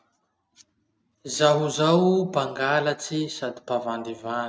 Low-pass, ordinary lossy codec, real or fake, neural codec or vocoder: none; none; real; none